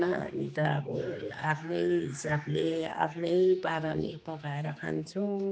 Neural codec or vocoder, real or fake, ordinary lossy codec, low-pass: codec, 16 kHz, 2 kbps, X-Codec, HuBERT features, trained on general audio; fake; none; none